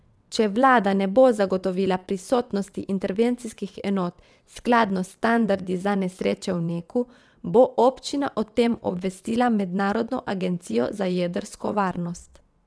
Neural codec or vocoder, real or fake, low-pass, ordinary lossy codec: vocoder, 22.05 kHz, 80 mel bands, WaveNeXt; fake; none; none